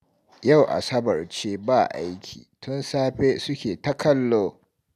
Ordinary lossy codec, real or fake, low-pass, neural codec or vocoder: none; real; 14.4 kHz; none